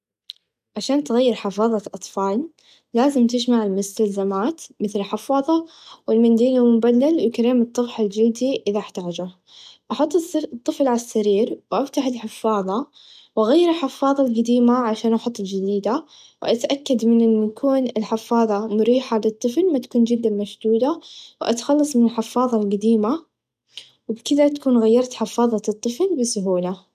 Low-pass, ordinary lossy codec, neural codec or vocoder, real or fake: 14.4 kHz; none; none; real